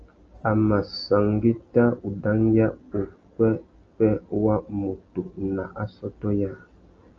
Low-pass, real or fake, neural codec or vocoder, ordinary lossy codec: 7.2 kHz; real; none; Opus, 24 kbps